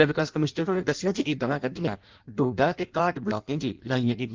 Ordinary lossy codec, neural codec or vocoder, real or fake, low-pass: Opus, 32 kbps; codec, 16 kHz in and 24 kHz out, 0.6 kbps, FireRedTTS-2 codec; fake; 7.2 kHz